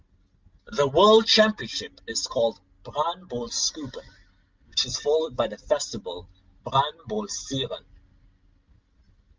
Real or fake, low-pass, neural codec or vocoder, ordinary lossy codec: real; 7.2 kHz; none; Opus, 32 kbps